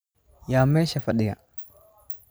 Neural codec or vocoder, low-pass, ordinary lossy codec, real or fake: none; none; none; real